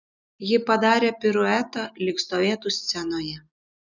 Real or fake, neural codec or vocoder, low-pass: real; none; 7.2 kHz